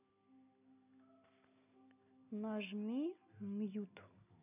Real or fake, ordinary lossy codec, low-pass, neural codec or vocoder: real; none; 3.6 kHz; none